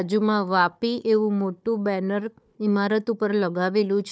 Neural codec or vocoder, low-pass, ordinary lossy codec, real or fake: codec, 16 kHz, 4 kbps, FunCodec, trained on Chinese and English, 50 frames a second; none; none; fake